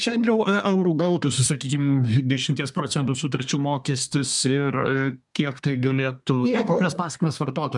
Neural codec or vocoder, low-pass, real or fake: codec, 24 kHz, 1 kbps, SNAC; 10.8 kHz; fake